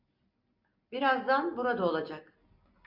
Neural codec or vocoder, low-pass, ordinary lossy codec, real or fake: none; 5.4 kHz; MP3, 48 kbps; real